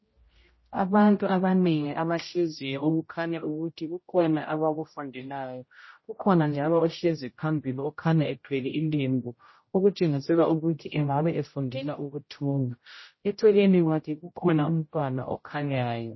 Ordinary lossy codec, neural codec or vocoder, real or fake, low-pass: MP3, 24 kbps; codec, 16 kHz, 0.5 kbps, X-Codec, HuBERT features, trained on general audio; fake; 7.2 kHz